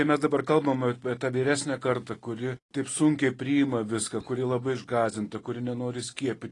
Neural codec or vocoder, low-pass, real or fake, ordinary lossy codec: none; 10.8 kHz; real; AAC, 32 kbps